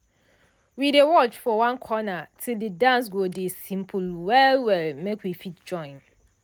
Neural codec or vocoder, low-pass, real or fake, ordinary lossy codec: none; none; real; none